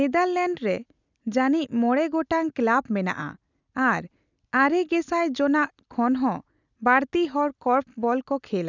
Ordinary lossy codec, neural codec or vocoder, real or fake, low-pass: none; none; real; 7.2 kHz